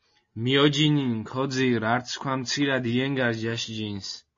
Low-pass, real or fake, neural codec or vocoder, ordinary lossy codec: 7.2 kHz; real; none; MP3, 32 kbps